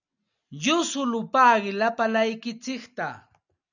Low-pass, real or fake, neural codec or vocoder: 7.2 kHz; real; none